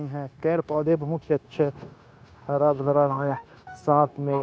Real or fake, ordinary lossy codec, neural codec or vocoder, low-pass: fake; none; codec, 16 kHz, 0.9 kbps, LongCat-Audio-Codec; none